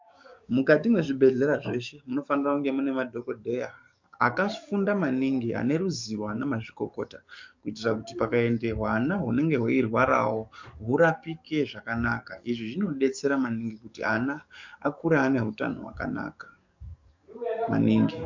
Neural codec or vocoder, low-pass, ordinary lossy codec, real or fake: codec, 44.1 kHz, 7.8 kbps, DAC; 7.2 kHz; MP3, 64 kbps; fake